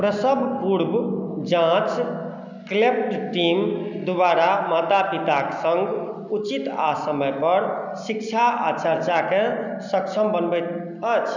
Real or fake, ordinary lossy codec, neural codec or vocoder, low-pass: real; none; none; 7.2 kHz